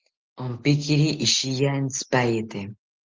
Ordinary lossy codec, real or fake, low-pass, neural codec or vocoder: Opus, 16 kbps; real; 7.2 kHz; none